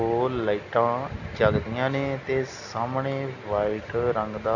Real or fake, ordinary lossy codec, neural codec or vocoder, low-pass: real; none; none; 7.2 kHz